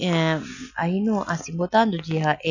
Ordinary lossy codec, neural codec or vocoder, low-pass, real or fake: none; none; 7.2 kHz; real